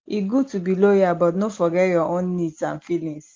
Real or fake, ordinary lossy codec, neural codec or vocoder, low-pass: real; Opus, 32 kbps; none; 7.2 kHz